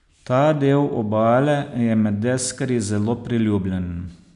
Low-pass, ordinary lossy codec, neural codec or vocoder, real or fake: 10.8 kHz; none; none; real